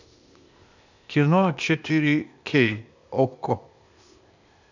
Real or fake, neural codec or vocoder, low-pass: fake; codec, 16 kHz, 0.8 kbps, ZipCodec; 7.2 kHz